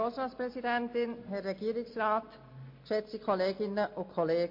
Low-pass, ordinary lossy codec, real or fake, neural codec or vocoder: 5.4 kHz; MP3, 32 kbps; real; none